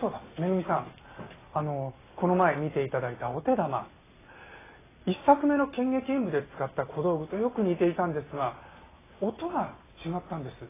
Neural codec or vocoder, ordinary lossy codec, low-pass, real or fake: none; AAC, 16 kbps; 3.6 kHz; real